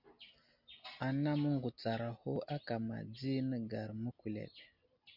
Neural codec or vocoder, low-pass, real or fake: none; 5.4 kHz; real